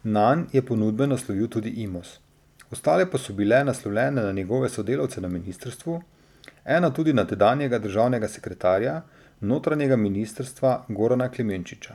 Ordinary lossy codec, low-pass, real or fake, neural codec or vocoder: none; 19.8 kHz; real; none